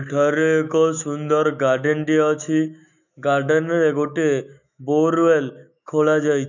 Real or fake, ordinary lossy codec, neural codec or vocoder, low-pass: fake; none; autoencoder, 48 kHz, 128 numbers a frame, DAC-VAE, trained on Japanese speech; 7.2 kHz